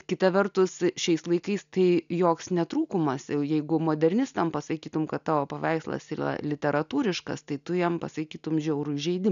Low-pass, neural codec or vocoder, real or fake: 7.2 kHz; none; real